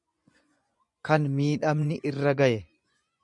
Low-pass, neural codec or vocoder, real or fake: 10.8 kHz; vocoder, 24 kHz, 100 mel bands, Vocos; fake